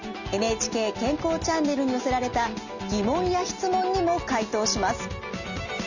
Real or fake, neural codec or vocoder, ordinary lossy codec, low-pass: real; none; none; 7.2 kHz